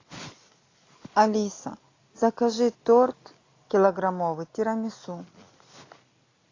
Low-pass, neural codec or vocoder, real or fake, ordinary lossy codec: 7.2 kHz; none; real; AAC, 32 kbps